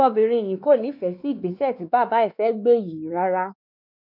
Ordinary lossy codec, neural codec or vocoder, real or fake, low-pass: none; codec, 16 kHz, 2 kbps, X-Codec, WavLM features, trained on Multilingual LibriSpeech; fake; 5.4 kHz